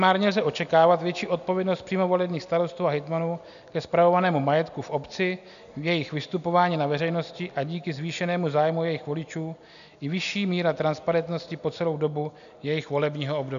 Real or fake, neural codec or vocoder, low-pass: real; none; 7.2 kHz